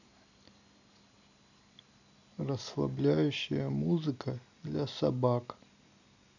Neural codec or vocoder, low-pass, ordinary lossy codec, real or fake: none; 7.2 kHz; none; real